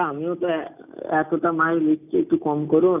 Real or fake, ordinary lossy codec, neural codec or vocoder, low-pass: real; none; none; 3.6 kHz